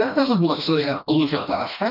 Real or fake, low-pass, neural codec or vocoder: fake; 5.4 kHz; codec, 16 kHz, 1 kbps, FreqCodec, smaller model